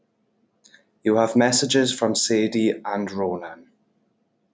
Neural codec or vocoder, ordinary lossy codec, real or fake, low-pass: none; none; real; none